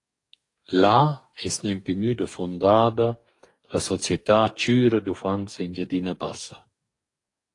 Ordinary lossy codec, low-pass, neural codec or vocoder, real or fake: AAC, 48 kbps; 10.8 kHz; codec, 44.1 kHz, 2.6 kbps, DAC; fake